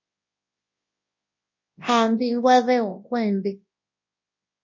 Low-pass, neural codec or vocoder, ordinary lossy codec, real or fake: 7.2 kHz; codec, 24 kHz, 0.9 kbps, WavTokenizer, large speech release; MP3, 32 kbps; fake